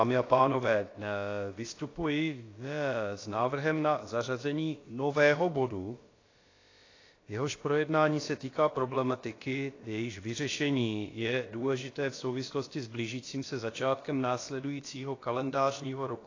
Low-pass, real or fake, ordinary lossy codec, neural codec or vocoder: 7.2 kHz; fake; AAC, 32 kbps; codec, 16 kHz, about 1 kbps, DyCAST, with the encoder's durations